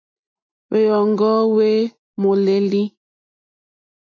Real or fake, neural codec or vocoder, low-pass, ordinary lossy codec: real; none; 7.2 kHz; MP3, 64 kbps